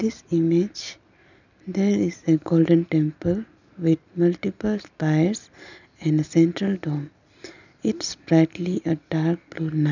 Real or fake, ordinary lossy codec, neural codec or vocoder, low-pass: real; none; none; 7.2 kHz